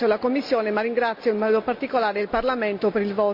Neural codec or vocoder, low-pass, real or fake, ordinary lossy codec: none; 5.4 kHz; real; none